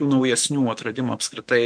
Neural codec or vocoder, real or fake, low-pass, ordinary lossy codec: autoencoder, 48 kHz, 128 numbers a frame, DAC-VAE, trained on Japanese speech; fake; 9.9 kHz; MP3, 64 kbps